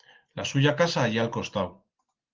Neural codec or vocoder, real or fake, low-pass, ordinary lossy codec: none; real; 7.2 kHz; Opus, 16 kbps